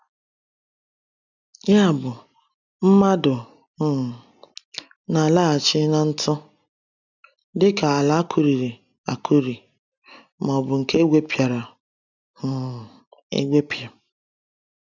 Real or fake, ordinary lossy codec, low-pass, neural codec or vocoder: real; none; 7.2 kHz; none